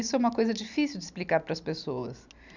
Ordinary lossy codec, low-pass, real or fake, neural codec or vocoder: none; 7.2 kHz; real; none